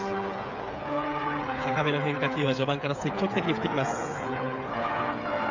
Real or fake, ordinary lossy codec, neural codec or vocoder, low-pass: fake; none; codec, 16 kHz, 8 kbps, FreqCodec, smaller model; 7.2 kHz